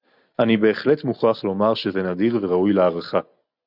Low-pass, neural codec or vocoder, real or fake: 5.4 kHz; none; real